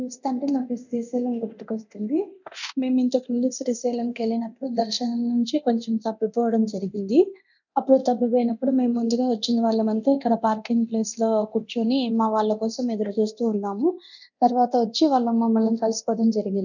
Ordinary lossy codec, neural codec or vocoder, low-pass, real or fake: none; codec, 24 kHz, 0.9 kbps, DualCodec; 7.2 kHz; fake